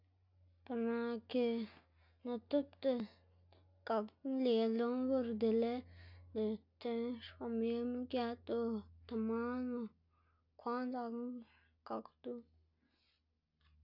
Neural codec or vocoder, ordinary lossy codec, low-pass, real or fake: none; none; 5.4 kHz; real